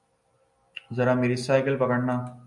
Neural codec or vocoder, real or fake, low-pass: none; real; 10.8 kHz